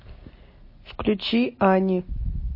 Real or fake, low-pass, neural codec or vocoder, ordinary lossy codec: real; 5.4 kHz; none; MP3, 24 kbps